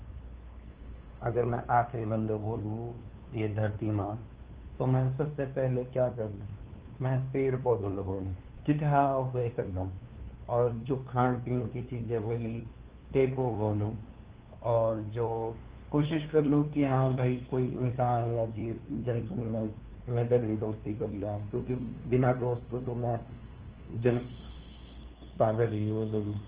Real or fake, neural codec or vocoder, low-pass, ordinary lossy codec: fake; codec, 16 kHz, 2 kbps, FunCodec, trained on LibriTTS, 25 frames a second; 3.6 kHz; Opus, 16 kbps